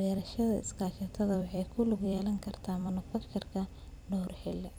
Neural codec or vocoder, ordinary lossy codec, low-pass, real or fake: vocoder, 44.1 kHz, 128 mel bands every 256 samples, BigVGAN v2; none; none; fake